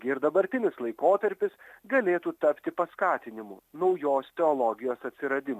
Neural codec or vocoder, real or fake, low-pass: none; real; 14.4 kHz